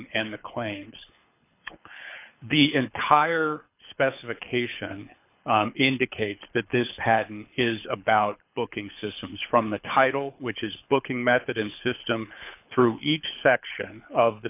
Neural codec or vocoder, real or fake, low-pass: codec, 24 kHz, 6 kbps, HILCodec; fake; 3.6 kHz